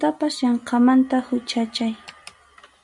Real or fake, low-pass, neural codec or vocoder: real; 10.8 kHz; none